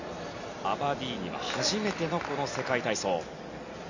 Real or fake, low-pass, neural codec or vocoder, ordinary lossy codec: real; 7.2 kHz; none; none